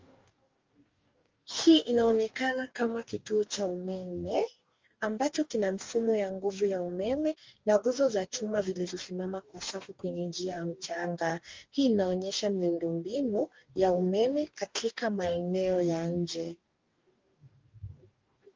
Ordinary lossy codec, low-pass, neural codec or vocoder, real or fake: Opus, 32 kbps; 7.2 kHz; codec, 44.1 kHz, 2.6 kbps, DAC; fake